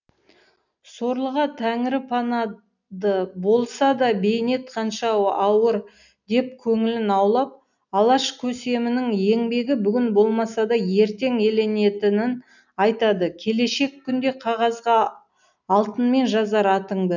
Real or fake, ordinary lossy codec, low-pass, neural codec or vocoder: real; none; 7.2 kHz; none